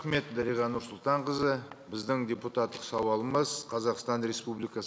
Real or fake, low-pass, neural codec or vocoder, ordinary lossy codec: real; none; none; none